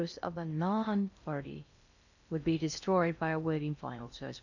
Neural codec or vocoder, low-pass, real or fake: codec, 16 kHz in and 24 kHz out, 0.6 kbps, FocalCodec, streaming, 2048 codes; 7.2 kHz; fake